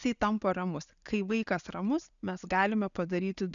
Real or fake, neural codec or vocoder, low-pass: real; none; 7.2 kHz